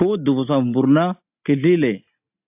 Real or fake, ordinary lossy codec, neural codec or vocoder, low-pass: real; AAC, 32 kbps; none; 3.6 kHz